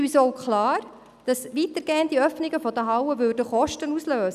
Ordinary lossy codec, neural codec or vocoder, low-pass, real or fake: none; none; 14.4 kHz; real